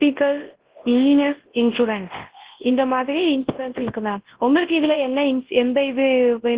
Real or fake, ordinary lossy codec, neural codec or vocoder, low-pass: fake; Opus, 16 kbps; codec, 24 kHz, 0.9 kbps, WavTokenizer, large speech release; 3.6 kHz